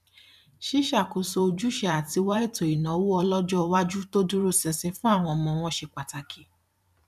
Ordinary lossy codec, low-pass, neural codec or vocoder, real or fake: none; 14.4 kHz; vocoder, 44.1 kHz, 128 mel bands every 512 samples, BigVGAN v2; fake